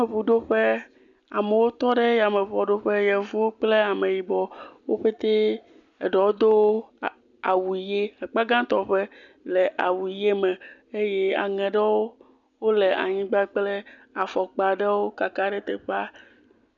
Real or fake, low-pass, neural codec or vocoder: real; 7.2 kHz; none